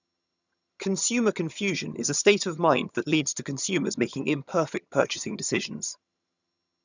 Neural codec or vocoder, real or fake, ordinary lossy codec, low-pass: vocoder, 22.05 kHz, 80 mel bands, HiFi-GAN; fake; none; 7.2 kHz